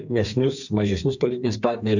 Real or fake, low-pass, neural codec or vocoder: fake; 7.2 kHz; codec, 32 kHz, 1.9 kbps, SNAC